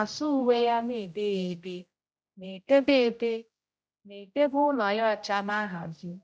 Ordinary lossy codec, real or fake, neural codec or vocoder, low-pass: none; fake; codec, 16 kHz, 0.5 kbps, X-Codec, HuBERT features, trained on general audio; none